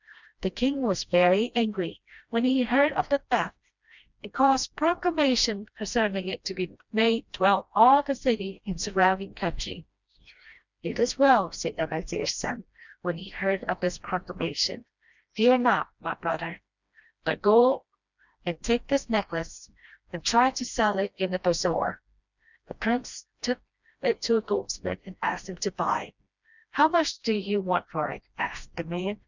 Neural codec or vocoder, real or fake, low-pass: codec, 16 kHz, 1 kbps, FreqCodec, smaller model; fake; 7.2 kHz